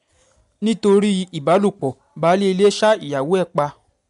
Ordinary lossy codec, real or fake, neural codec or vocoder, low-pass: MP3, 64 kbps; real; none; 10.8 kHz